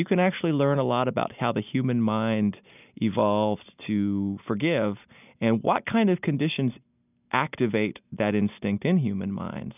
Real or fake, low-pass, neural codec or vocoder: real; 3.6 kHz; none